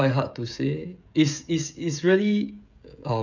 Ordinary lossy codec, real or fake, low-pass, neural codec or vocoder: none; fake; 7.2 kHz; codec, 16 kHz, 16 kbps, FreqCodec, smaller model